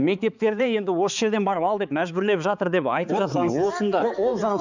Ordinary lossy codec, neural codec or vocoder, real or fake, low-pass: none; codec, 16 kHz, 4 kbps, X-Codec, HuBERT features, trained on balanced general audio; fake; 7.2 kHz